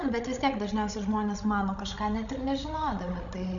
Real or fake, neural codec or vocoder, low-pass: fake; codec, 16 kHz, 16 kbps, FreqCodec, larger model; 7.2 kHz